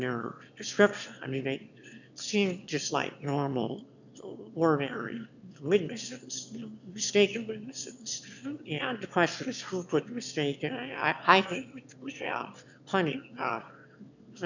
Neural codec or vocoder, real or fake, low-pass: autoencoder, 22.05 kHz, a latent of 192 numbers a frame, VITS, trained on one speaker; fake; 7.2 kHz